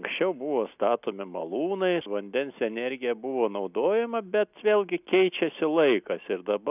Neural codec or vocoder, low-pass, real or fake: none; 3.6 kHz; real